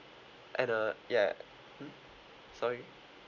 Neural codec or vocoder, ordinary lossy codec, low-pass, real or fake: none; none; 7.2 kHz; real